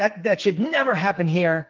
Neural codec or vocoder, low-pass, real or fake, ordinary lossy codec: codec, 44.1 kHz, 2.6 kbps, SNAC; 7.2 kHz; fake; Opus, 32 kbps